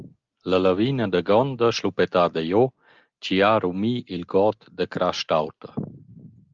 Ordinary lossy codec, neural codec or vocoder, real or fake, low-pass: Opus, 32 kbps; none; real; 7.2 kHz